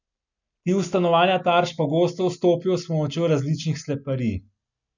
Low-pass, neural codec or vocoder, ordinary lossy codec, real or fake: 7.2 kHz; none; none; real